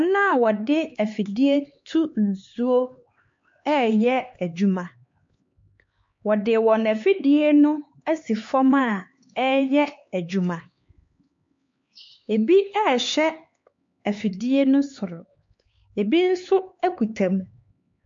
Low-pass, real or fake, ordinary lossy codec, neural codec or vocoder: 7.2 kHz; fake; MP3, 48 kbps; codec, 16 kHz, 4 kbps, X-Codec, HuBERT features, trained on LibriSpeech